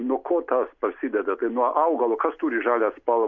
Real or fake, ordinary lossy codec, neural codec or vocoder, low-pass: real; MP3, 64 kbps; none; 7.2 kHz